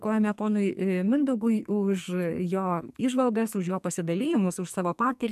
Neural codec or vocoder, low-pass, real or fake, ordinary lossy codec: codec, 44.1 kHz, 2.6 kbps, SNAC; 14.4 kHz; fake; MP3, 96 kbps